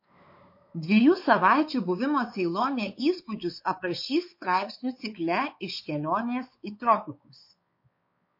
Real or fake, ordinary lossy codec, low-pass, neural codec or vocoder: fake; MP3, 32 kbps; 5.4 kHz; codec, 16 kHz, 4 kbps, X-Codec, WavLM features, trained on Multilingual LibriSpeech